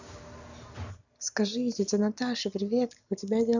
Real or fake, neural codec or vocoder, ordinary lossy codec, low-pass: real; none; none; 7.2 kHz